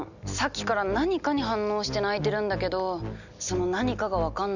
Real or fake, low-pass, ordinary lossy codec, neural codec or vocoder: real; 7.2 kHz; none; none